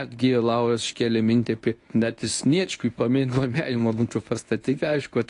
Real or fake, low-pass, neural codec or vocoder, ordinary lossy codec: fake; 10.8 kHz; codec, 24 kHz, 0.9 kbps, WavTokenizer, medium speech release version 1; AAC, 48 kbps